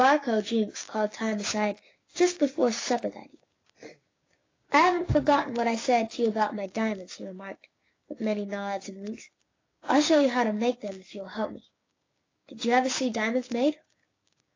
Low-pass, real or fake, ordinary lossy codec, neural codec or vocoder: 7.2 kHz; fake; AAC, 32 kbps; codec, 16 kHz, 6 kbps, DAC